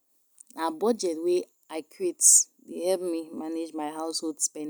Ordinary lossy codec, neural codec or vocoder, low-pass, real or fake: none; none; none; real